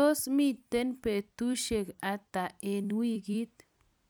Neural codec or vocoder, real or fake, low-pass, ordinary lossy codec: vocoder, 44.1 kHz, 128 mel bands every 256 samples, BigVGAN v2; fake; none; none